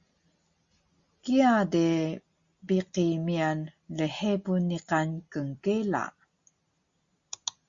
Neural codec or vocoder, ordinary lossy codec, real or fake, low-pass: none; Opus, 64 kbps; real; 7.2 kHz